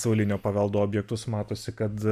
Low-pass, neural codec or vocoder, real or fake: 14.4 kHz; none; real